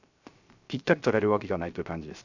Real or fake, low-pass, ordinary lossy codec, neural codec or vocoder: fake; 7.2 kHz; none; codec, 16 kHz, 0.3 kbps, FocalCodec